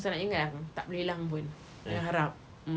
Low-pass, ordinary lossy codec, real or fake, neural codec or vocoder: none; none; real; none